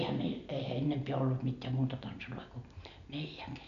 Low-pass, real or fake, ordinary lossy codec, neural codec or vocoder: 7.2 kHz; real; none; none